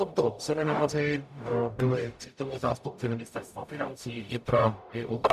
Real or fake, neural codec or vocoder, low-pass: fake; codec, 44.1 kHz, 0.9 kbps, DAC; 14.4 kHz